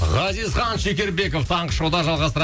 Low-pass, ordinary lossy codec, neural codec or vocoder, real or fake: none; none; none; real